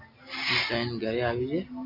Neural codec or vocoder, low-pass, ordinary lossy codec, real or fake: none; 5.4 kHz; MP3, 32 kbps; real